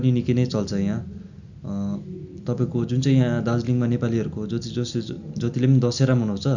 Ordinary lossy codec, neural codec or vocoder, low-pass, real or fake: none; none; 7.2 kHz; real